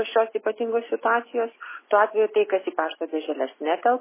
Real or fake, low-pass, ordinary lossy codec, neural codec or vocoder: real; 3.6 kHz; MP3, 16 kbps; none